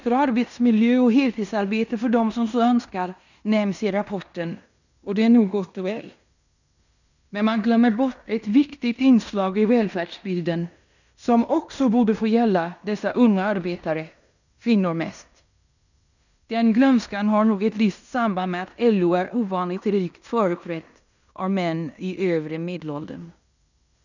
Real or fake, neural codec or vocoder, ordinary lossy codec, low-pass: fake; codec, 16 kHz in and 24 kHz out, 0.9 kbps, LongCat-Audio-Codec, fine tuned four codebook decoder; none; 7.2 kHz